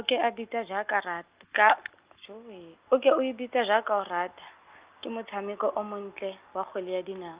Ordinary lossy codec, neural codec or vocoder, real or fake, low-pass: Opus, 24 kbps; none; real; 3.6 kHz